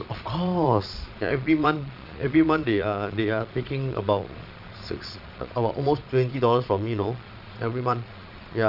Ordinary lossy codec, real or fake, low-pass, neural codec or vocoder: none; fake; 5.4 kHz; vocoder, 22.05 kHz, 80 mel bands, Vocos